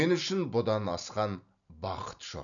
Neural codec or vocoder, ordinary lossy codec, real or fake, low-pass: none; none; real; 7.2 kHz